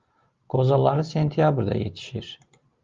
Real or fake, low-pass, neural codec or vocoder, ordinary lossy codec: real; 7.2 kHz; none; Opus, 32 kbps